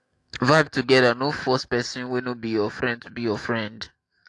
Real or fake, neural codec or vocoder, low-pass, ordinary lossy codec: fake; autoencoder, 48 kHz, 128 numbers a frame, DAC-VAE, trained on Japanese speech; 10.8 kHz; AAC, 48 kbps